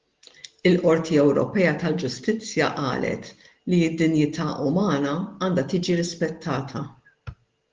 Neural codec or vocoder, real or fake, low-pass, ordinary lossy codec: none; real; 7.2 kHz; Opus, 16 kbps